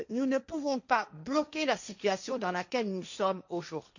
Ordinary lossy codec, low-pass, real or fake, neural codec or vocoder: none; 7.2 kHz; fake; codec, 16 kHz, 1.1 kbps, Voila-Tokenizer